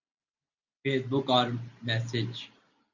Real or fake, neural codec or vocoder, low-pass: real; none; 7.2 kHz